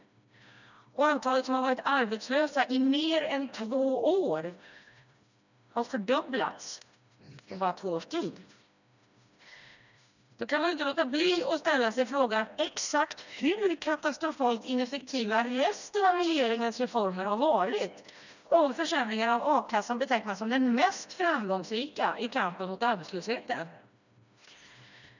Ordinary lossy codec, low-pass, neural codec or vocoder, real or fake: none; 7.2 kHz; codec, 16 kHz, 1 kbps, FreqCodec, smaller model; fake